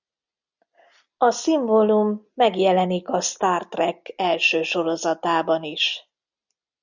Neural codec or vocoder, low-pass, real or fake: none; 7.2 kHz; real